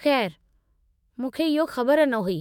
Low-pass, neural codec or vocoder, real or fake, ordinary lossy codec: 19.8 kHz; autoencoder, 48 kHz, 128 numbers a frame, DAC-VAE, trained on Japanese speech; fake; MP3, 96 kbps